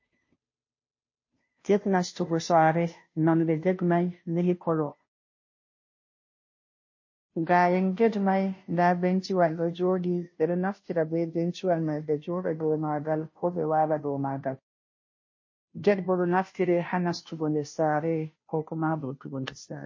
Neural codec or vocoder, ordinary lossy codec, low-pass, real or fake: codec, 16 kHz, 0.5 kbps, FunCodec, trained on Chinese and English, 25 frames a second; MP3, 32 kbps; 7.2 kHz; fake